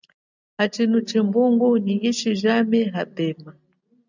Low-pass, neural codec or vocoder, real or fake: 7.2 kHz; none; real